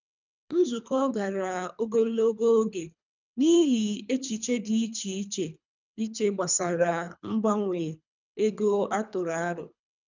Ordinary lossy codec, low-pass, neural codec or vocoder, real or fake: none; 7.2 kHz; codec, 24 kHz, 3 kbps, HILCodec; fake